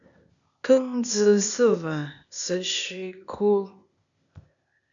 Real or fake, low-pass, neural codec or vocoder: fake; 7.2 kHz; codec, 16 kHz, 0.8 kbps, ZipCodec